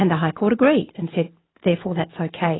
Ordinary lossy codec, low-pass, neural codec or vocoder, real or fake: AAC, 16 kbps; 7.2 kHz; none; real